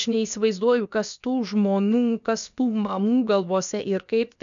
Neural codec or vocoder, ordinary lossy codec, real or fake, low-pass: codec, 16 kHz, about 1 kbps, DyCAST, with the encoder's durations; MP3, 96 kbps; fake; 7.2 kHz